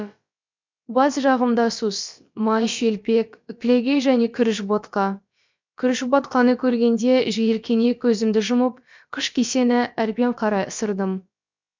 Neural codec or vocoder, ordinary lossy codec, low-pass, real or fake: codec, 16 kHz, about 1 kbps, DyCAST, with the encoder's durations; MP3, 64 kbps; 7.2 kHz; fake